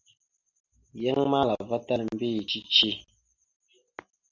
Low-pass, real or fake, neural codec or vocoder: 7.2 kHz; real; none